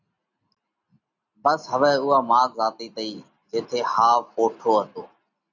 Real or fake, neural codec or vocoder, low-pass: real; none; 7.2 kHz